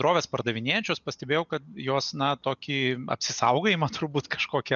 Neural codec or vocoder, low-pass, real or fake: none; 7.2 kHz; real